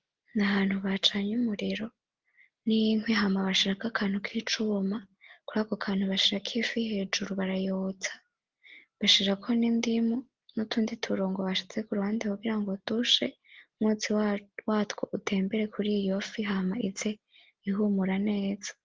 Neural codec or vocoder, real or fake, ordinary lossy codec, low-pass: none; real; Opus, 16 kbps; 7.2 kHz